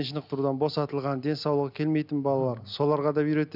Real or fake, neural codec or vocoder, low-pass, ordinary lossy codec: real; none; 5.4 kHz; none